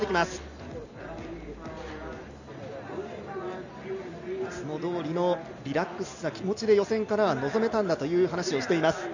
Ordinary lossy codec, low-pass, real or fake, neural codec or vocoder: none; 7.2 kHz; real; none